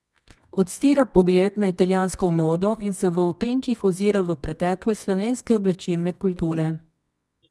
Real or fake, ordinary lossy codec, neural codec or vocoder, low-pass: fake; none; codec, 24 kHz, 0.9 kbps, WavTokenizer, medium music audio release; none